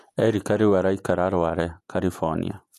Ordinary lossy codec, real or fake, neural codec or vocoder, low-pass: none; fake; vocoder, 48 kHz, 128 mel bands, Vocos; 14.4 kHz